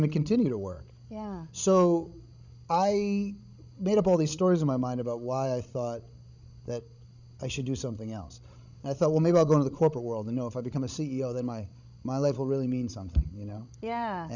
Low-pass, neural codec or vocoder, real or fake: 7.2 kHz; codec, 16 kHz, 16 kbps, FreqCodec, larger model; fake